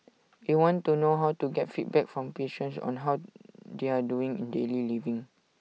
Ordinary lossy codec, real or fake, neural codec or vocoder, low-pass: none; real; none; none